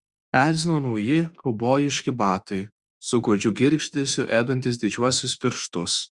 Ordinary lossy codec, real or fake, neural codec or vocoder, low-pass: Opus, 64 kbps; fake; autoencoder, 48 kHz, 32 numbers a frame, DAC-VAE, trained on Japanese speech; 10.8 kHz